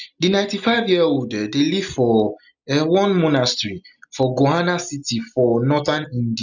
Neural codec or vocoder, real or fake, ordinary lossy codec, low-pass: none; real; none; 7.2 kHz